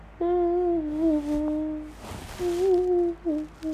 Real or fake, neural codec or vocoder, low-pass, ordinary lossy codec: real; none; 14.4 kHz; none